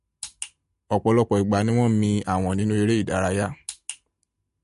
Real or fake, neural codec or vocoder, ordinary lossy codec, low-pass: real; none; MP3, 48 kbps; 14.4 kHz